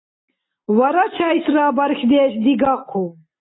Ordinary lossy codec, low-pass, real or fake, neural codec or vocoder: AAC, 16 kbps; 7.2 kHz; real; none